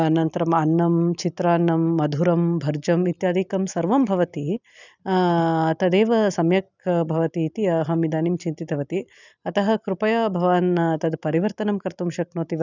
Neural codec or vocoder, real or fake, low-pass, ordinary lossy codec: none; real; 7.2 kHz; none